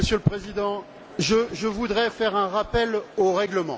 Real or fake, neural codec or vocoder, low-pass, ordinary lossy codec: real; none; none; none